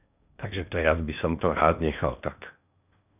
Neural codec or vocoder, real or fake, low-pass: codec, 16 kHz in and 24 kHz out, 0.8 kbps, FocalCodec, streaming, 65536 codes; fake; 3.6 kHz